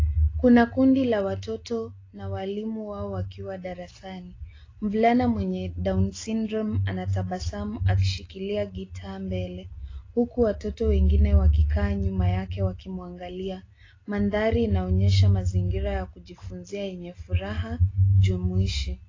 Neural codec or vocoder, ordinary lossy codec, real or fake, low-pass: none; AAC, 32 kbps; real; 7.2 kHz